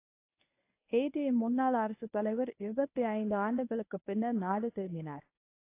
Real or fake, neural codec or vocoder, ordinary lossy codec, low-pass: fake; codec, 24 kHz, 0.9 kbps, WavTokenizer, medium speech release version 1; AAC, 24 kbps; 3.6 kHz